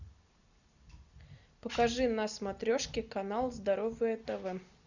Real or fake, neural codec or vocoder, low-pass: real; none; 7.2 kHz